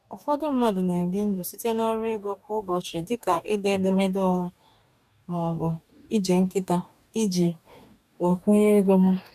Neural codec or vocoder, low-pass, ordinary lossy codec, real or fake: codec, 44.1 kHz, 2.6 kbps, DAC; 14.4 kHz; none; fake